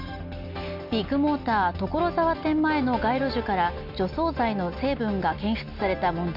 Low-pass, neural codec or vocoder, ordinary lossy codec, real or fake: 5.4 kHz; none; none; real